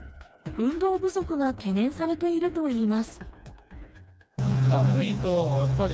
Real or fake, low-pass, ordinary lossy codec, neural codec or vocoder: fake; none; none; codec, 16 kHz, 2 kbps, FreqCodec, smaller model